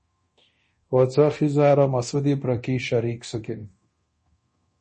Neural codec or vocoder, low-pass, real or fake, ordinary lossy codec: codec, 24 kHz, 0.9 kbps, DualCodec; 10.8 kHz; fake; MP3, 32 kbps